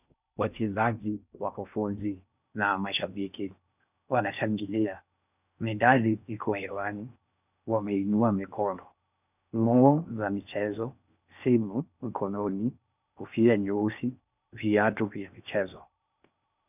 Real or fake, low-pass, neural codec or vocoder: fake; 3.6 kHz; codec, 16 kHz in and 24 kHz out, 0.6 kbps, FocalCodec, streaming, 4096 codes